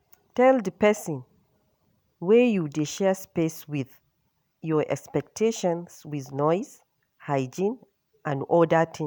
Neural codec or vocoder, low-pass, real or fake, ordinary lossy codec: none; none; real; none